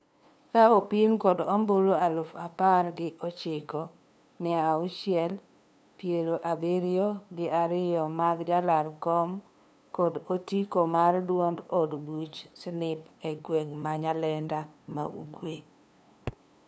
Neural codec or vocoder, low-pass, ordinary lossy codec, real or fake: codec, 16 kHz, 2 kbps, FunCodec, trained on LibriTTS, 25 frames a second; none; none; fake